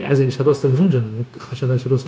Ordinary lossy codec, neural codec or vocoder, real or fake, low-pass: none; codec, 16 kHz, 0.9 kbps, LongCat-Audio-Codec; fake; none